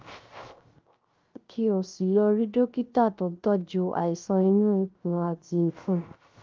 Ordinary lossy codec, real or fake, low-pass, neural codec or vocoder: Opus, 32 kbps; fake; 7.2 kHz; codec, 16 kHz, 0.3 kbps, FocalCodec